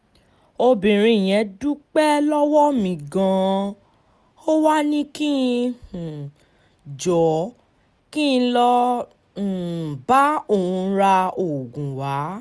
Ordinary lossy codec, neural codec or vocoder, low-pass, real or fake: none; none; none; real